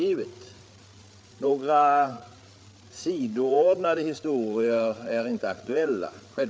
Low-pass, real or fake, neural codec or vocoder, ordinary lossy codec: none; fake; codec, 16 kHz, 8 kbps, FreqCodec, larger model; none